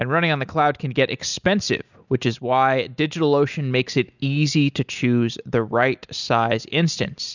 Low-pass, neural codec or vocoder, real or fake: 7.2 kHz; none; real